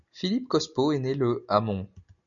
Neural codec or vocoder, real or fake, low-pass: none; real; 7.2 kHz